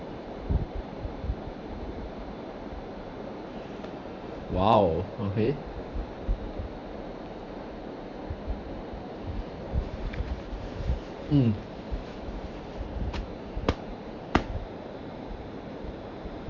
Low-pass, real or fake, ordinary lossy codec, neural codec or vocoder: 7.2 kHz; fake; none; vocoder, 44.1 kHz, 128 mel bands every 512 samples, BigVGAN v2